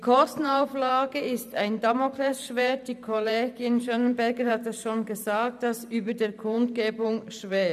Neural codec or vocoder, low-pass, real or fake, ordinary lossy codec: vocoder, 44.1 kHz, 128 mel bands every 512 samples, BigVGAN v2; 14.4 kHz; fake; none